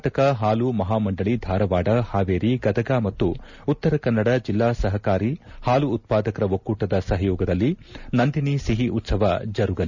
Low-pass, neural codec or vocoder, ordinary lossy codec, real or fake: 7.2 kHz; none; none; real